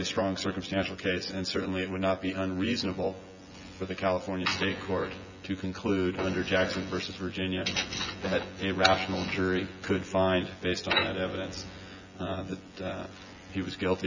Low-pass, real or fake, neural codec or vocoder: 7.2 kHz; fake; vocoder, 24 kHz, 100 mel bands, Vocos